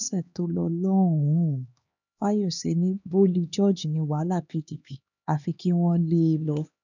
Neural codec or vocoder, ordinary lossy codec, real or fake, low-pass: codec, 16 kHz, 2 kbps, X-Codec, HuBERT features, trained on LibriSpeech; none; fake; 7.2 kHz